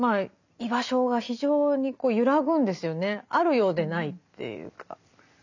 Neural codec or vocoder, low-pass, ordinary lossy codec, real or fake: none; 7.2 kHz; none; real